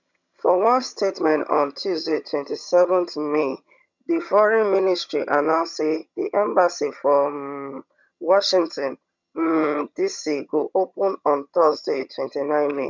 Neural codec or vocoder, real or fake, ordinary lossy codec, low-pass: vocoder, 22.05 kHz, 80 mel bands, HiFi-GAN; fake; MP3, 64 kbps; 7.2 kHz